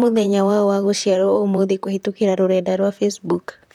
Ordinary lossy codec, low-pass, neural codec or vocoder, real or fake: none; 19.8 kHz; vocoder, 44.1 kHz, 128 mel bands, Pupu-Vocoder; fake